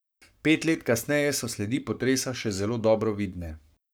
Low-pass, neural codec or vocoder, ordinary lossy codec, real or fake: none; codec, 44.1 kHz, 7.8 kbps, Pupu-Codec; none; fake